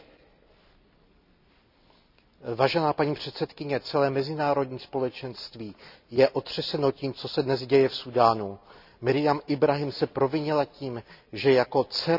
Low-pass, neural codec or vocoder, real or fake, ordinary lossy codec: 5.4 kHz; none; real; none